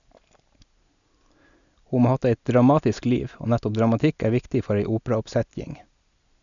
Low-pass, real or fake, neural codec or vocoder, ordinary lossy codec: 7.2 kHz; real; none; none